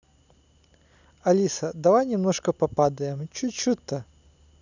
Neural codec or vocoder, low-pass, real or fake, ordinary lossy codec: none; 7.2 kHz; real; none